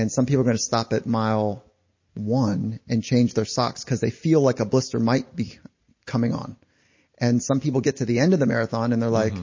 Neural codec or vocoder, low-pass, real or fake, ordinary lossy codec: none; 7.2 kHz; real; MP3, 32 kbps